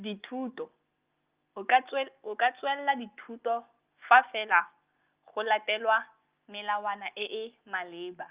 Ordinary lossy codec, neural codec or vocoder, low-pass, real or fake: Opus, 32 kbps; none; 3.6 kHz; real